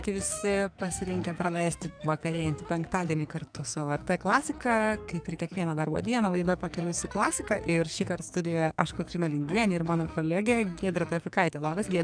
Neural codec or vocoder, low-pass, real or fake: codec, 44.1 kHz, 2.6 kbps, SNAC; 9.9 kHz; fake